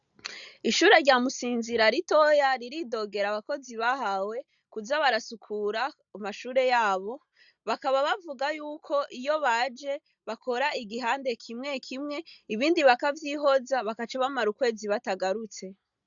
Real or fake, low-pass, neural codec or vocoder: real; 7.2 kHz; none